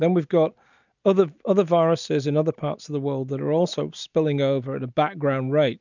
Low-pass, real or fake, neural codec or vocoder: 7.2 kHz; real; none